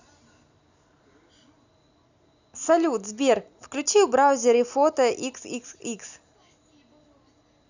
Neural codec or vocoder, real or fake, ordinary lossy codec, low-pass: none; real; none; 7.2 kHz